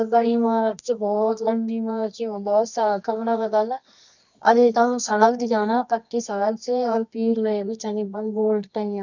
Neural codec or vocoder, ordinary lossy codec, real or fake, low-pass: codec, 24 kHz, 0.9 kbps, WavTokenizer, medium music audio release; none; fake; 7.2 kHz